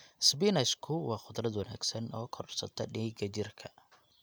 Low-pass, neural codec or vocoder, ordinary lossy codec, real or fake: none; none; none; real